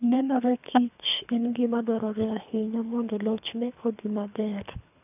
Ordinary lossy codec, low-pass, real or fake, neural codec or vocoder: none; 3.6 kHz; fake; codec, 24 kHz, 3 kbps, HILCodec